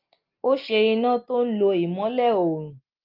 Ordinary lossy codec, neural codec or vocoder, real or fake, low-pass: Opus, 32 kbps; none; real; 5.4 kHz